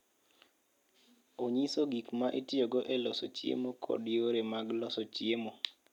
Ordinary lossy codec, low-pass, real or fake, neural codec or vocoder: none; 19.8 kHz; real; none